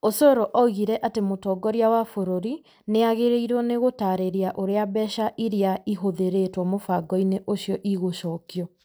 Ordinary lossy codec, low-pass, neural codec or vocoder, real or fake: none; none; none; real